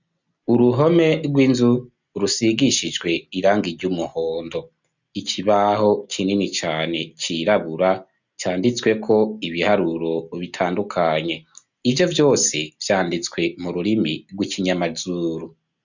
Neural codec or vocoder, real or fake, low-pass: none; real; 7.2 kHz